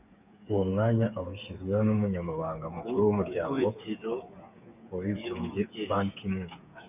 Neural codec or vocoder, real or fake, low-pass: codec, 16 kHz, 8 kbps, FreqCodec, smaller model; fake; 3.6 kHz